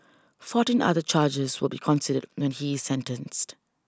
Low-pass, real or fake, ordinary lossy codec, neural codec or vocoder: none; real; none; none